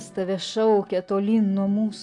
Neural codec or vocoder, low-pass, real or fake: none; 10.8 kHz; real